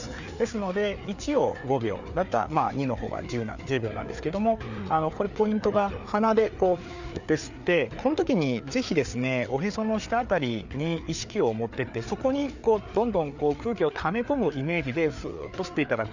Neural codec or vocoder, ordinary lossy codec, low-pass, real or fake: codec, 16 kHz, 4 kbps, FreqCodec, larger model; none; 7.2 kHz; fake